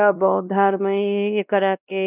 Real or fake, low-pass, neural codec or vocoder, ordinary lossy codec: fake; 3.6 kHz; codec, 16 kHz, 1 kbps, X-Codec, WavLM features, trained on Multilingual LibriSpeech; none